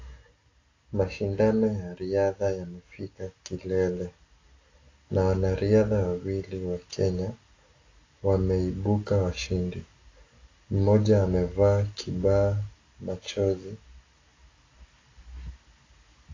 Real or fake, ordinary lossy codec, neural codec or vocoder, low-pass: real; AAC, 32 kbps; none; 7.2 kHz